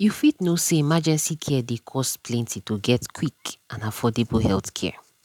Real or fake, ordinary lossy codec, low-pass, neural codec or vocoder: real; none; 19.8 kHz; none